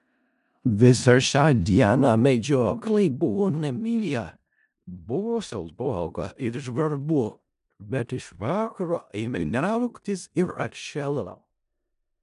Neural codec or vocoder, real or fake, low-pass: codec, 16 kHz in and 24 kHz out, 0.4 kbps, LongCat-Audio-Codec, four codebook decoder; fake; 10.8 kHz